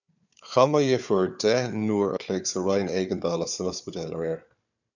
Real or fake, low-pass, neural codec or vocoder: fake; 7.2 kHz; codec, 16 kHz, 4 kbps, FunCodec, trained on Chinese and English, 50 frames a second